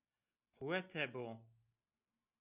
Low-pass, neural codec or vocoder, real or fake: 3.6 kHz; none; real